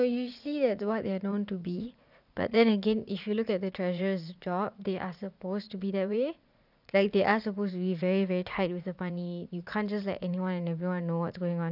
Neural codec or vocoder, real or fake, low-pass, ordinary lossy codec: vocoder, 44.1 kHz, 80 mel bands, Vocos; fake; 5.4 kHz; none